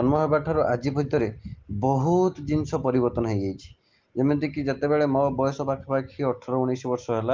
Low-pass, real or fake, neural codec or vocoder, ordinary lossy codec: 7.2 kHz; real; none; Opus, 32 kbps